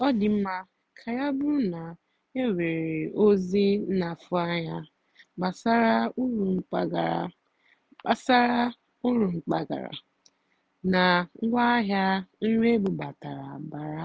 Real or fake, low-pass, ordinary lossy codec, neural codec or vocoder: real; none; none; none